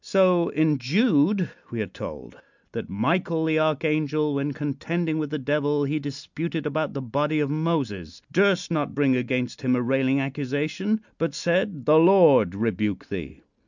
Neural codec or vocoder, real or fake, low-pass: none; real; 7.2 kHz